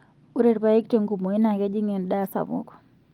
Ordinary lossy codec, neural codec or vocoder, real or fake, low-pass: Opus, 32 kbps; none; real; 19.8 kHz